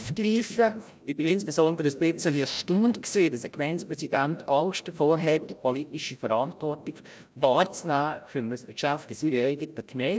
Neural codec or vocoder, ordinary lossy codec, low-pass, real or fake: codec, 16 kHz, 0.5 kbps, FreqCodec, larger model; none; none; fake